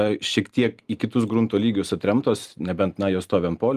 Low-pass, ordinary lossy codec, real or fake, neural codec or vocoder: 14.4 kHz; Opus, 32 kbps; real; none